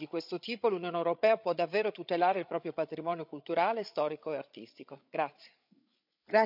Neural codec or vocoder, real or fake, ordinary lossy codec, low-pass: codec, 16 kHz, 8 kbps, FreqCodec, larger model; fake; none; 5.4 kHz